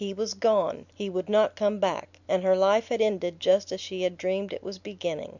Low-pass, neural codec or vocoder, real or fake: 7.2 kHz; none; real